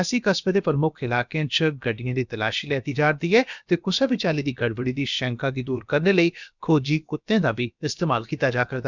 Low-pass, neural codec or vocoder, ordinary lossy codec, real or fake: 7.2 kHz; codec, 16 kHz, about 1 kbps, DyCAST, with the encoder's durations; none; fake